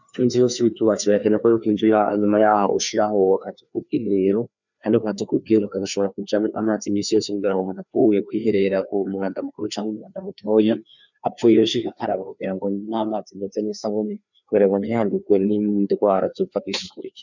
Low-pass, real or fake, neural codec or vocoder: 7.2 kHz; fake; codec, 16 kHz, 2 kbps, FreqCodec, larger model